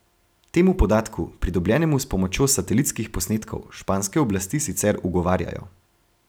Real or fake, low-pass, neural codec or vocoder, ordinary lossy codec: real; none; none; none